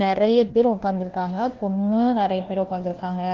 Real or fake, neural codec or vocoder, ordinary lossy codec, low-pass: fake; codec, 16 kHz, 1 kbps, FunCodec, trained on LibriTTS, 50 frames a second; Opus, 16 kbps; 7.2 kHz